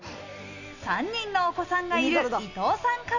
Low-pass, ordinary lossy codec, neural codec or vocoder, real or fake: 7.2 kHz; none; none; real